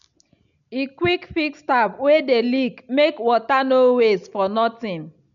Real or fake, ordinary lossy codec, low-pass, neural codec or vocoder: real; none; 7.2 kHz; none